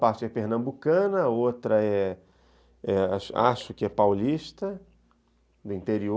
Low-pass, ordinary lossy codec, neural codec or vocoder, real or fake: none; none; none; real